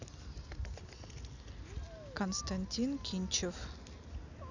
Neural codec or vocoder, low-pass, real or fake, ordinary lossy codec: none; 7.2 kHz; real; none